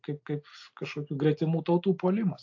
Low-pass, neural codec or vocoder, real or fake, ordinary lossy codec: 7.2 kHz; none; real; AAC, 48 kbps